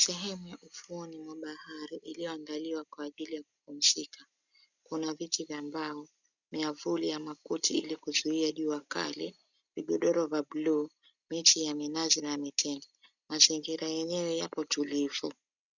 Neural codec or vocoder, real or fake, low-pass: none; real; 7.2 kHz